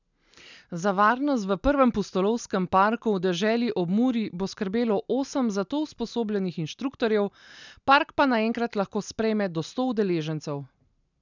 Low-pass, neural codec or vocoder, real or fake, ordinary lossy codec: 7.2 kHz; none; real; none